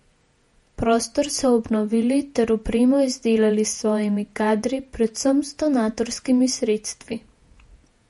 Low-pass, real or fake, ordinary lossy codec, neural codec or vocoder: 19.8 kHz; fake; MP3, 48 kbps; vocoder, 48 kHz, 128 mel bands, Vocos